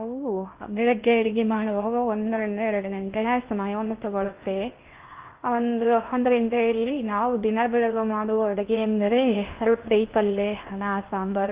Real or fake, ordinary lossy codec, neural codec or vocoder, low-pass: fake; Opus, 32 kbps; codec, 16 kHz in and 24 kHz out, 0.6 kbps, FocalCodec, streaming, 2048 codes; 3.6 kHz